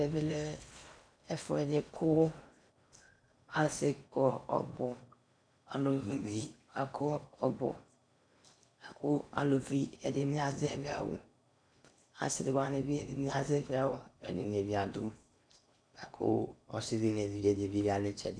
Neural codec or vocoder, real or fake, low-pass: codec, 16 kHz in and 24 kHz out, 0.8 kbps, FocalCodec, streaming, 65536 codes; fake; 9.9 kHz